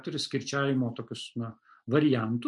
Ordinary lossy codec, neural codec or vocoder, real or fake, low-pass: MP3, 64 kbps; none; real; 10.8 kHz